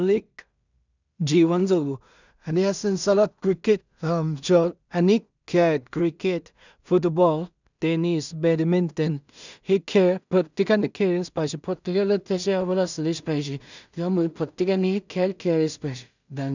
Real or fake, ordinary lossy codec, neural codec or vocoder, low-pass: fake; none; codec, 16 kHz in and 24 kHz out, 0.4 kbps, LongCat-Audio-Codec, two codebook decoder; 7.2 kHz